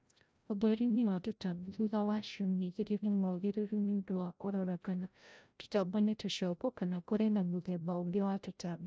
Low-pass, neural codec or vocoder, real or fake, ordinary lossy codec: none; codec, 16 kHz, 0.5 kbps, FreqCodec, larger model; fake; none